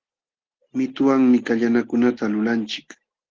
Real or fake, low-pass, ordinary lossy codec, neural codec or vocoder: real; 7.2 kHz; Opus, 16 kbps; none